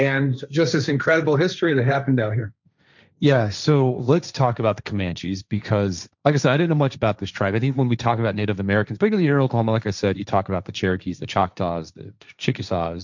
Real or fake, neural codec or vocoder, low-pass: fake; codec, 16 kHz, 1.1 kbps, Voila-Tokenizer; 7.2 kHz